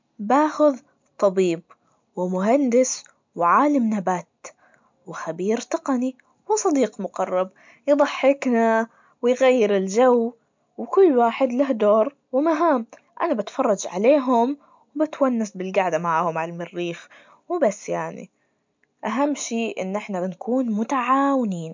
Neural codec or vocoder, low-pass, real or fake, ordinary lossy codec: none; 7.2 kHz; real; MP3, 64 kbps